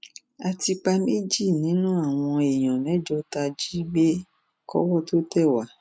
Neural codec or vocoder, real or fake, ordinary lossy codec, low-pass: none; real; none; none